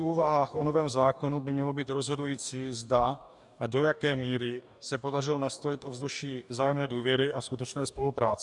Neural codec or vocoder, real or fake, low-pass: codec, 44.1 kHz, 2.6 kbps, DAC; fake; 10.8 kHz